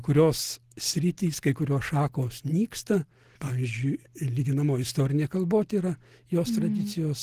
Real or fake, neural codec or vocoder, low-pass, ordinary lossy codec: real; none; 14.4 kHz; Opus, 16 kbps